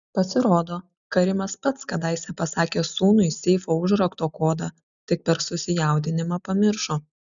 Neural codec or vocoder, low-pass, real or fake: none; 7.2 kHz; real